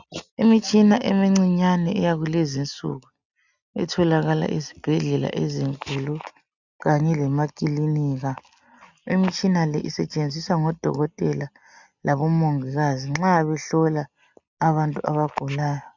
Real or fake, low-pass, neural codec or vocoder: real; 7.2 kHz; none